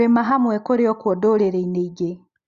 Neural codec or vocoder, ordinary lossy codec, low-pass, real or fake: none; Opus, 64 kbps; 7.2 kHz; real